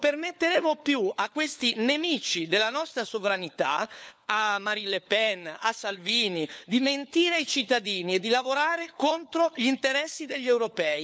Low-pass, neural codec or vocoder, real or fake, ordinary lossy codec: none; codec, 16 kHz, 4 kbps, FunCodec, trained on LibriTTS, 50 frames a second; fake; none